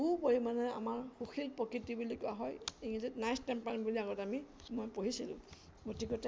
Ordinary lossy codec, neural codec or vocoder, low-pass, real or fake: none; none; none; real